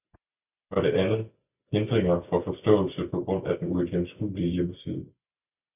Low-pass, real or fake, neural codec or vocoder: 3.6 kHz; real; none